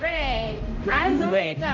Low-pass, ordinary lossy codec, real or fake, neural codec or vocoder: 7.2 kHz; none; fake; codec, 16 kHz, 0.5 kbps, X-Codec, HuBERT features, trained on general audio